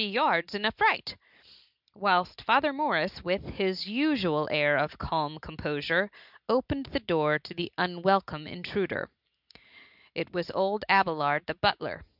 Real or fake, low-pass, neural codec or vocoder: real; 5.4 kHz; none